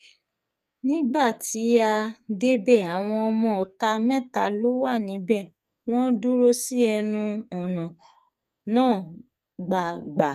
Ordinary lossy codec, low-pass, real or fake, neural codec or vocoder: none; 14.4 kHz; fake; codec, 44.1 kHz, 2.6 kbps, SNAC